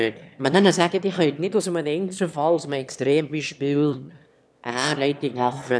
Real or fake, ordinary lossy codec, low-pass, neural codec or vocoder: fake; none; none; autoencoder, 22.05 kHz, a latent of 192 numbers a frame, VITS, trained on one speaker